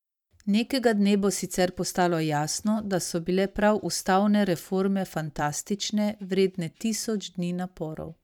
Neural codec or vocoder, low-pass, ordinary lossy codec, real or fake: vocoder, 44.1 kHz, 128 mel bands every 512 samples, BigVGAN v2; 19.8 kHz; none; fake